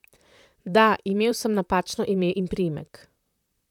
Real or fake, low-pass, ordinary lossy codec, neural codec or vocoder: fake; 19.8 kHz; none; vocoder, 44.1 kHz, 128 mel bands, Pupu-Vocoder